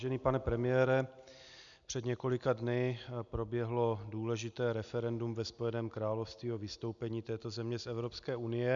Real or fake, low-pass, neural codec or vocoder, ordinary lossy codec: real; 7.2 kHz; none; MP3, 96 kbps